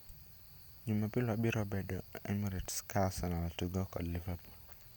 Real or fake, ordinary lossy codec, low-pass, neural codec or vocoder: real; none; none; none